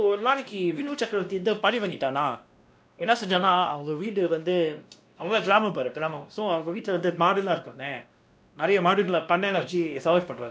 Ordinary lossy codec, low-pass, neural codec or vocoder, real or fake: none; none; codec, 16 kHz, 1 kbps, X-Codec, WavLM features, trained on Multilingual LibriSpeech; fake